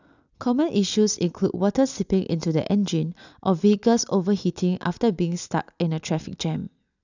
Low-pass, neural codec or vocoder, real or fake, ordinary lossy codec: 7.2 kHz; none; real; none